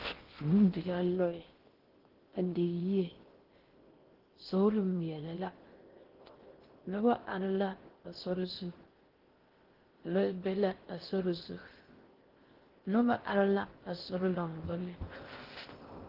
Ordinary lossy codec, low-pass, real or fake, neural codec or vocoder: Opus, 16 kbps; 5.4 kHz; fake; codec, 16 kHz in and 24 kHz out, 0.6 kbps, FocalCodec, streaming, 2048 codes